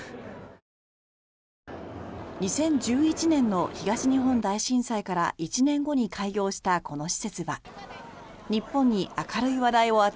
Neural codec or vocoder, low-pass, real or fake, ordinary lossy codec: none; none; real; none